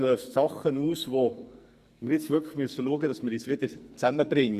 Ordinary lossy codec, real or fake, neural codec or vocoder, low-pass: Opus, 64 kbps; fake; codec, 44.1 kHz, 2.6 kbps, SNAC; 14.4 kHz